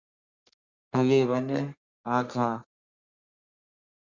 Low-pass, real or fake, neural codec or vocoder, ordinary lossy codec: 7.2 kHz; fake; codec, 32 kHz, 1.9 kbps, SNAC; Opus, 64 kbps